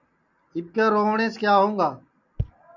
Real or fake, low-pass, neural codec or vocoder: real; 7.2 kHz; none